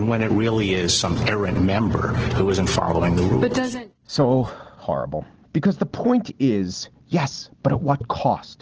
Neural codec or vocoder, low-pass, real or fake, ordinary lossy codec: none; 7.2 kHz; real; Opus, 16 kbps